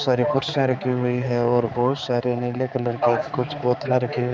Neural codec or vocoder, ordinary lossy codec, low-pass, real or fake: codec, 16 kHz, 4 kbps, X-Codec, HuBERT features, trained on balanced general audio; none; none; fake